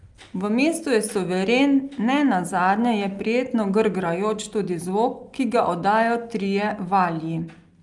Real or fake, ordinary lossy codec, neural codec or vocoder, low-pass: real; Opus, 32 kbps; none; 10.8 kHz